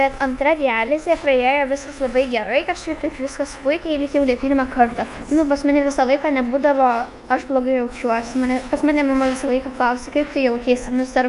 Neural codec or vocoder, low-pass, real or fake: codec, 24 kHz, 1.2 kbps, DualCodec; 10.8 kHz; fake